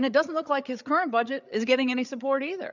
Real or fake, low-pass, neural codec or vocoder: fake; 7.2 kHz; codec, 16 kHz, 8 kbps, FreqCodec, larger model